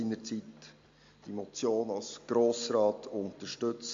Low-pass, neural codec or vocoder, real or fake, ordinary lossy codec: 7.2 kHz; none; real; MP3, 48 kbps